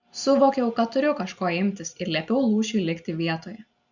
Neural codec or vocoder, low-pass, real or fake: none; 7.2 kHz; real